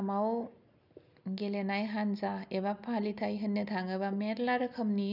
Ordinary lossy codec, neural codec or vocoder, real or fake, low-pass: none; none; real; 5.4 kHz